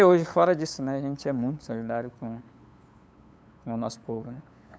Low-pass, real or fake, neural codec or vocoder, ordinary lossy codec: none; fake; codec, 16 kHz, 8 kbps, FunCodec, trained on LibriTTS, 25 frames a second; none